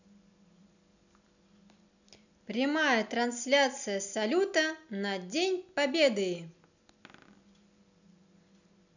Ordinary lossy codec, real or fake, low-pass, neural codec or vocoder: none; real; 7.2 kHz; none